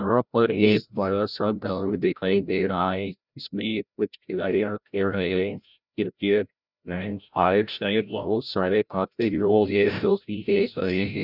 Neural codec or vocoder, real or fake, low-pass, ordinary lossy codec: codec, 16 kHz, 0.5 kbps, FreqCodec, larger model; fake; 5.4 kHz; none